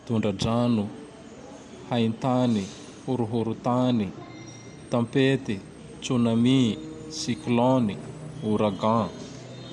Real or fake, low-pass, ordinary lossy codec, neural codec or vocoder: real; none; none; none